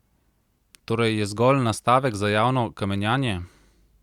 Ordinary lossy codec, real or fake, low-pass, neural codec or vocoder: Opus, 64 kbps; real; 19.8 kHz; none